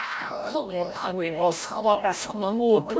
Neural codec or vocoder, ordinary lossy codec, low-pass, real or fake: codec, 16 kHz, 0.5 kbps, FreqCodec, larger model; none; none; fake